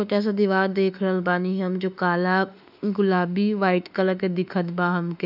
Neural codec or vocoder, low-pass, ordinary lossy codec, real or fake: autoencoder, 48 kHz, 32 numbers a frame, DAC-VAE, trained on Japanese speech; 5.4 kHz; none; fake